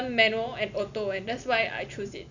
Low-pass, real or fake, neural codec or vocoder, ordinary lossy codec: 7.2 kHz; real; none; none